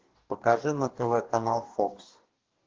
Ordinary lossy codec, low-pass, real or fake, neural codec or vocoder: Opus, 16 kbps; 7.2 kHz; fake; codec, 44.1 kHz, 2.6 kbps, DAC